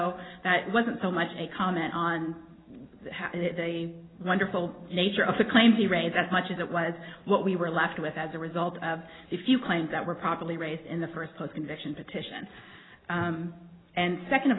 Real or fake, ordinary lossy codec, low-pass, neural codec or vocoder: real; AAC, 16 kbps; 7.2 kHz; none